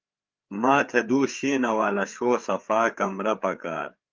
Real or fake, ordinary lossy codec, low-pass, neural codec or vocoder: fake; Opus, 32 kbps; 7.2 kHz; codec, 16 kHz, 4 kbps, FreqCodec, larger model